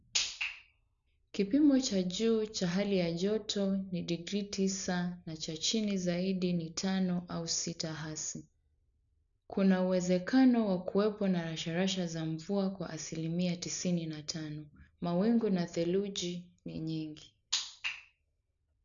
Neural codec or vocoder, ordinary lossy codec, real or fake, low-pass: none; none; real; 7.2 kHz